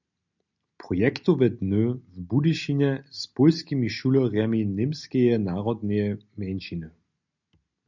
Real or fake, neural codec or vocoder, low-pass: real; none; 7.2 kHz